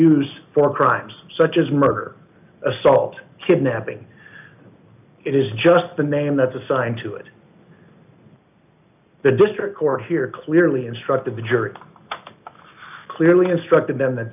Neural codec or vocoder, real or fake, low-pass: none; real; 3.6 kHz